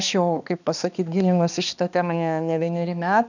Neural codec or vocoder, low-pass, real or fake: codec, 16 kHz, 2 kbps, X-Codec, HuBERT features, trained on balanced general audio; 7.2 kHz; fake